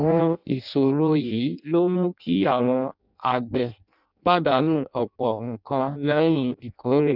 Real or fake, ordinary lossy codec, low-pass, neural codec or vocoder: fake; none; 5.4 kHz; codec, 16 kHz in and 24 kHz out, 0.6 kbps, FireRedTTS-2 codec